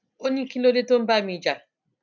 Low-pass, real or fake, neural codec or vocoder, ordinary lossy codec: 7.2 kHz; real; none; none